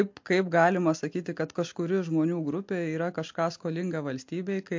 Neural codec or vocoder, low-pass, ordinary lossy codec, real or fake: none; 7.2 kHz; MP3, 48 kbps; real